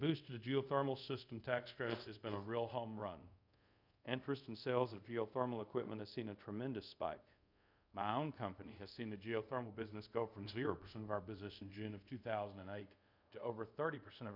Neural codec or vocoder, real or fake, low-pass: codec, 24 kHz, 0.5 kbps, DualCodec; fake; 5.4 kHz